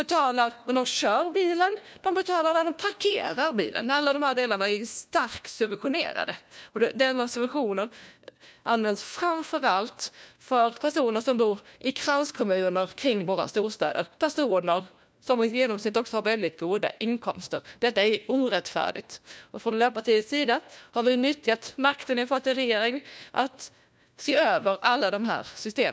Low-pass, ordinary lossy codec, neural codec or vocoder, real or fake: none; none; codec, 16 kHz, 1 kbps, FunCodec, trained on LibriTTS, 50 frames a second; fake